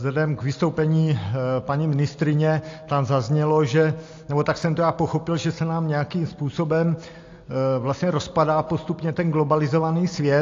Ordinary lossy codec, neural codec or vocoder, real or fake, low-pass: AAC, 48 kbps; none; real; 7.2 kHz